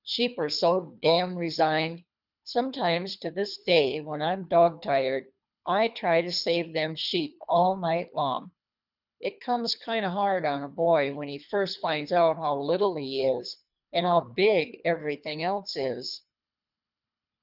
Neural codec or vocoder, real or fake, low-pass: codec, 24 kHz, 3 kbps, HILCodec; fake; 5.4 kHz